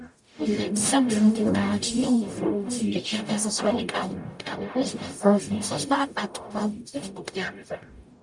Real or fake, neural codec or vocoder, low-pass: fake; codec, 44.1 kHz, 0.9 kbps, DAC; 10.8 kHz